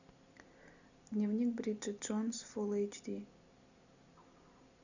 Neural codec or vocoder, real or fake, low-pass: none; real; 7.2 kHz